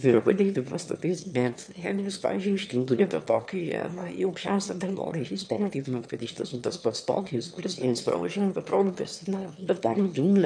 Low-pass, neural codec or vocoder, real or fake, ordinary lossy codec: 9.9 kHz; autoencoder, 22.05 kHz, a latent of 192 numbers a frame, VITS, trained on one speaker; fake; MP3, 64 kbps